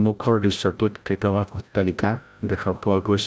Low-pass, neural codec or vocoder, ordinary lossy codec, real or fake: none; codec, 16 kHz, 0.5 kbps, FreqCodec, larger model; none; fake